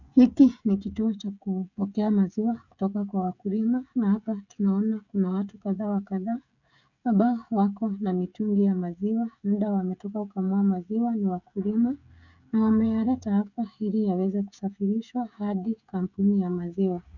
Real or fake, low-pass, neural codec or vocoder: fake; 7.2 kHz; codec, 16 kHz, 16 kbps, FreqCodec, smaller model